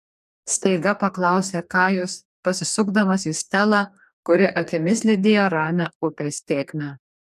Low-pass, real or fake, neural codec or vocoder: 14.4 kHz; fake; codec, 44.1 kHz, 2.6 kbps, DAC